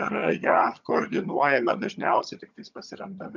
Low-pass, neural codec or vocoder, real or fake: 7.2 kHz; vocoder, 22.05 kHz, 80 mel bands, HiFi-GAN; fake